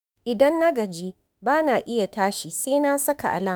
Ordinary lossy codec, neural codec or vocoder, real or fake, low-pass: none; autoencoder, 48 kHz, 32 numbers a frame, DAC-VAE, trained on Japanese speech; fake; none